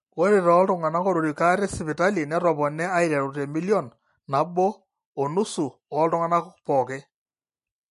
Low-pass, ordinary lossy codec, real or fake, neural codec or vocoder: 14.4 kHz; MP3, 48 kbps; real; none